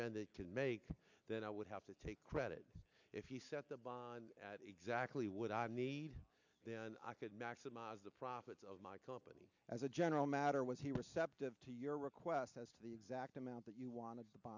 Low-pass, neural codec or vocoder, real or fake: 7.2 kHz; none; real